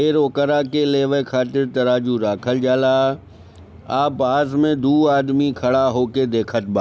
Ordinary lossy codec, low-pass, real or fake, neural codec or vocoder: none; none; real; none